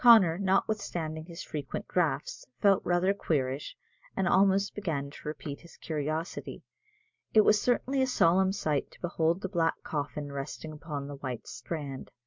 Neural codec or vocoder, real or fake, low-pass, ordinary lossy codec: none; real; 7.2 kHz; MP3, 64 kbps